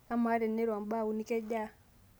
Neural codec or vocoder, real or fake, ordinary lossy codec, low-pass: none; real; none; none